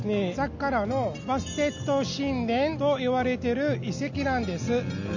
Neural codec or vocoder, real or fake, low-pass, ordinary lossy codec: none; real; 7.2 kHz; none